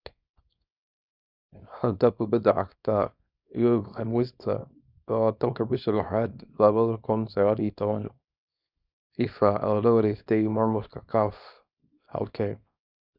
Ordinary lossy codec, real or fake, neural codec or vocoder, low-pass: none; fake; codec, 24 kHz, 0.9 kbps, WavTokenizer, small release; 5.4 kHz